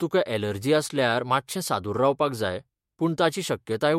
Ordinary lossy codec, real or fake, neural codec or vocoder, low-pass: MP3, 64 kbps; fake; autoencoder, 48 kHz, 128 numbers a frame, DAC-VAE, trained on Japanese speech; 19.8 kHz